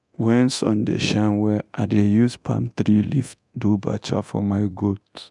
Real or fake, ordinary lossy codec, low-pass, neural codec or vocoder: fake; none; 10.8 kHz; codec, 24 kHz, 0.9 kbps, DualCodec